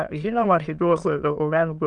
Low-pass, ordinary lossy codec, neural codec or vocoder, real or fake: 9.9 kHz; Opus, 32 kbps; autoencoder, 22.05 kHz, a latent of 192 numbers a frame, VITS, trained on many speakers; fake